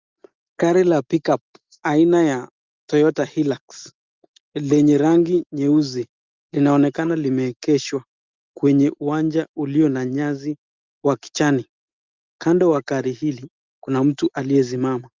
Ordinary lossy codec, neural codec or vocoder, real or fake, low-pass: Opus, 24 kbps; none; real; 7.2 kHz